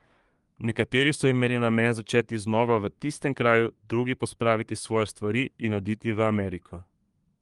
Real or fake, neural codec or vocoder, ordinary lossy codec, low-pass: fake; codec, 24 kHz, 1 kbps, SNAC; Opus, 32 kbps; 10.8 kHz